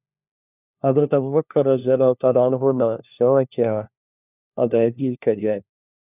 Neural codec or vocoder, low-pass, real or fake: codec, 16 kHz, 1 kbps, FunCodec, trained on LibriTTS, 50 frames a second; 3.6 kHz; fake